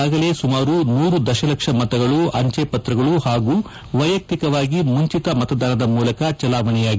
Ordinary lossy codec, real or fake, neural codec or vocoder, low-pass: none; real; none; none